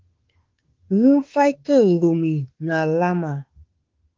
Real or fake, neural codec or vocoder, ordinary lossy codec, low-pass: fake; autoencoder, 48 kHz, 32 numbers a frame, DAC-VAE, trained on Japanese speech; Opus, 16 kbps; 7.2 kHz